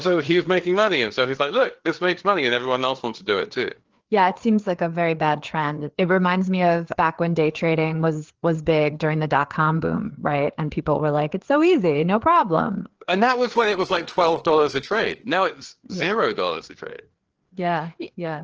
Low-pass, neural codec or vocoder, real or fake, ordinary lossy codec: 7.2 kHz; codec, 16 kHz, 4 kbps, FreqCodec, larger model; fake; Opus, 16 kbps